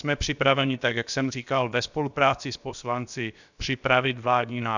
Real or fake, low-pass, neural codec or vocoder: fake; 7.2 kHz; codec, 16 kHz, about 1 kbps, DyCAST, with the encoder's durations